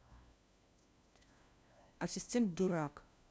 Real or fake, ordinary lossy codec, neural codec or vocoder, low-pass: fake; none; codec, 16 kHz, 1 kbps, FunCodec, trained on LibriTTS, 50 frames a second; none